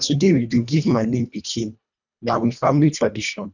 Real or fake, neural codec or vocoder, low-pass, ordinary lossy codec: fake; codec, 24 kHz, 1.5 kbps, HILCodec; 7.2 kHz; none